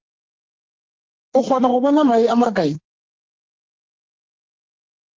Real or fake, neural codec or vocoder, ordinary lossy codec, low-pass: fake; codec, 44.1 kHz, 2.6 kbps, SNAC; Opus, 16 kbps; 7.2 kHz